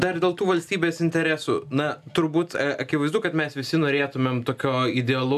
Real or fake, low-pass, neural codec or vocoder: real; 14.4 kHz; none